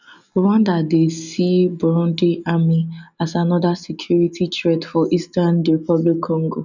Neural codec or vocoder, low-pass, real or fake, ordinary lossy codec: none; 7.2 kHz; real; none